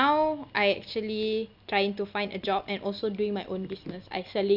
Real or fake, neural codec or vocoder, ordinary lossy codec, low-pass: real; none; none; 5.4 kHz